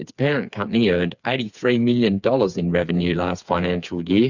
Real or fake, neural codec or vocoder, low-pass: fake; codec, 16 kHz, 4 kbps, FreqCodec, smaller model; 7.2 kHz